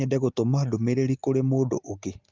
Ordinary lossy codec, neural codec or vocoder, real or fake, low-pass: Opus, 24 kbps; none; real; 7.2 kHz